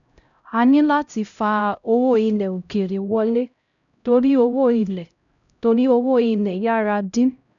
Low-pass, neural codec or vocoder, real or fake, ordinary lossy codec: 7.2 kHz; codec, 16 kHz, 0.5 kbps, X-Codec, HuBERT features, trained on LibriSpeech; fake; none